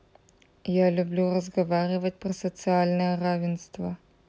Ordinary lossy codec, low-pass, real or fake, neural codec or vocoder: none; none; real; none